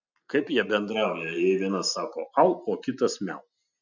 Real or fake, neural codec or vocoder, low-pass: real; none; 7.2 kHz